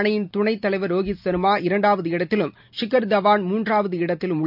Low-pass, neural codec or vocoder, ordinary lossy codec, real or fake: 5.4 kHz; none; none; real